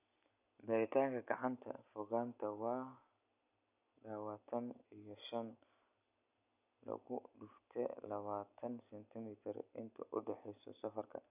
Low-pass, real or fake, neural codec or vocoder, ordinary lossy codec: 3.6 kHz; real; none; none